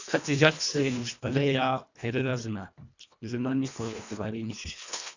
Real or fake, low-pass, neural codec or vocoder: fake; 7.2 kHz; codec, 24 kHz, 1.5 kbps, HILCodec